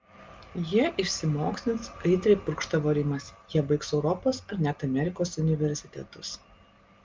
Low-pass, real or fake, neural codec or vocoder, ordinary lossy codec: 7.2 kHz; real; none; Opus, 32 kbps